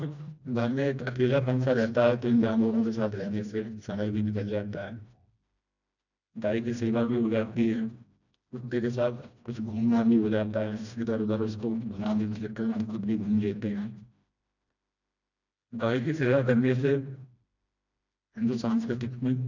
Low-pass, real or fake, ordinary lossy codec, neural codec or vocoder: 7.2 kHz; fake; none; codec, 16 kHz, 1 kbps, FreqCodec, smaller model